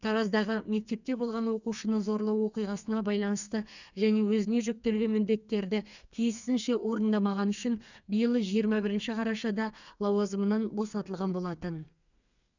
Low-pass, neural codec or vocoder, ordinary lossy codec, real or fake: 7.2 kHz; codec, 32 kHz, 1.9 kbps, SNAC; none; fake